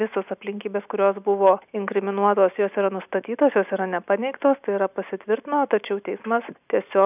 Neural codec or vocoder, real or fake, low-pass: none; real; 3.6 kHz